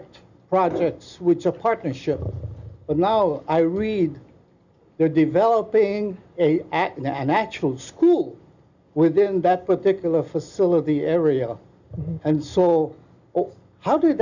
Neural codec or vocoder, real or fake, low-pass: none; real; 7.2 kHz